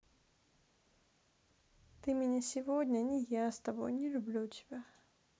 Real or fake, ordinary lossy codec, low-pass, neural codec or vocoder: real; none; none; none